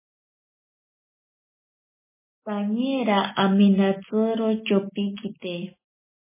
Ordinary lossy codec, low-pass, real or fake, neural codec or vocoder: MP3, 16 kbps; 3.6 kHz; real; none